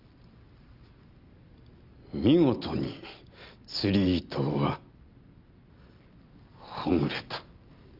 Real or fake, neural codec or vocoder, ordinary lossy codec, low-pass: real; none; Opus, 32 kbps; 5.4 kHz